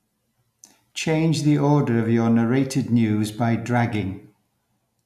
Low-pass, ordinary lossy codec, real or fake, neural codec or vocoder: 14.4 kHz; none; real; none